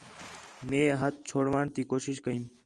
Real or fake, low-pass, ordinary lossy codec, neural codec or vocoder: fake; 10.8 kHz; Opus, 32 kbps; vocoder, 44.1 kHz, 128 mel bands every 512 samples, BigVGAN v2